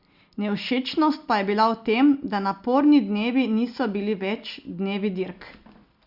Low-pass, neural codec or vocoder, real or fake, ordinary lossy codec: 5.4 kHz; none; real; Opus, 64 kbps